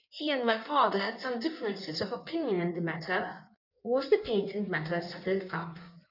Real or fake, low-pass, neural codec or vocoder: fake; 5.4 kHz; codec, 16 kHz in and 24 kHz out, 1.1 kbps, FireRedTTS-2 codec